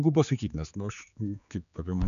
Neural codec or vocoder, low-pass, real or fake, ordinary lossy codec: codec, 16 kHz, 4 kbps, X-Codec, HuBERT features, trained on general audio; 7.2 kHz; fake; MP3, 96 kbps